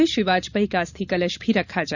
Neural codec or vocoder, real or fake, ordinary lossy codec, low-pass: vocoder, 44.1 kHz, 128 mel bands every 512 samples, BigVGAN v2; fake; none; 7.2 kHz